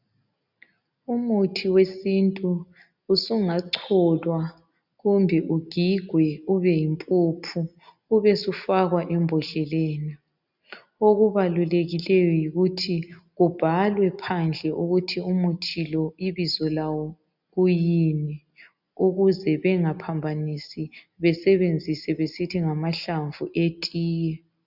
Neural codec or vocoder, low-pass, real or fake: none; 5.4 kHz; real